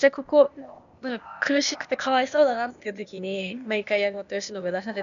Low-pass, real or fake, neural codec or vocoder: 7.2 kHz; fake; codec, 16 kHz, 0.8 kbps, ZipCodec